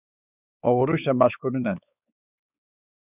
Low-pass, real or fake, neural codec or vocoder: 3.6 kHz; fake; codec, 16 kHz, 4 kbps, X-Codec, WavLM features, trained on Multilingual LibriSpeech